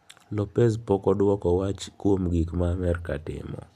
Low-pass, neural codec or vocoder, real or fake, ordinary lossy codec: 14.4 kHz; none; real; none